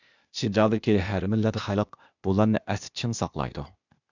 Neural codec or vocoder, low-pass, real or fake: codec, 16 kHz, 0.8 kbps, ZipCodec; 7.2 kHz; fake